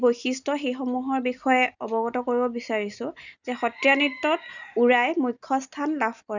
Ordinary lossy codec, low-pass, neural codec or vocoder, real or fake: none; 7.2 kHz; none; real